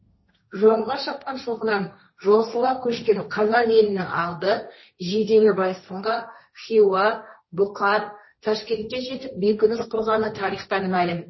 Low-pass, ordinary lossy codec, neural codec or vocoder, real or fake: 7.2 kHz; MP3, 24 kbps; codec, 16 kHz, 1.1 kbps, Voila-Tokenizer; fake